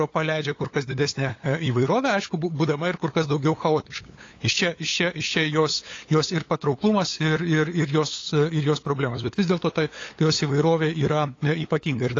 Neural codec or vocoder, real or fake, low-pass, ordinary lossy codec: codec, 16 kHz, 8 kbps, FunCodec, trained on LibriTTS, 25 frames a second; fake; 7.2 kHz; AAC, 32 kbps